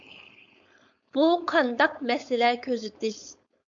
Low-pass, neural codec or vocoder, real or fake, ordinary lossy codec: 7.2 kHz; codec, 16 kHz, 4.8 kbps, FACodec; fake; MP3, 64 kbps